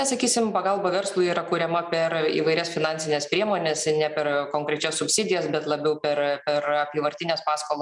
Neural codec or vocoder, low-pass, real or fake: none; 10.8 kHz; real